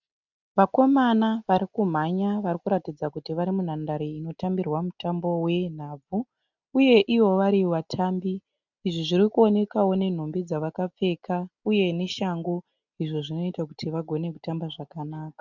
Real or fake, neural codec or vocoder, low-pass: real; none; 7.2 kHz